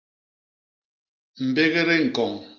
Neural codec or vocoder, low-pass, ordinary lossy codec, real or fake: none; 7.2 kHz; Opus, 32 kbps; real